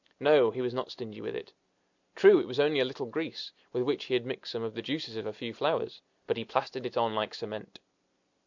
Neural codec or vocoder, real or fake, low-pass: none; real; 7.2 kHz